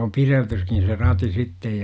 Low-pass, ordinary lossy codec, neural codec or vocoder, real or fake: none; none; none; real